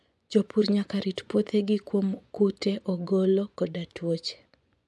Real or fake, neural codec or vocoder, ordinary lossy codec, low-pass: fake; vocoder, 24 kHz, 100 mel bands, Vocos; none; none